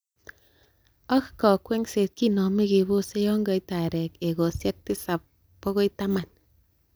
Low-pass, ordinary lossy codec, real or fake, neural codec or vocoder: none; none; real; none